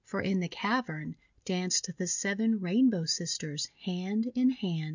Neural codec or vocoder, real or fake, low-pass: none; real; 7.2 kHz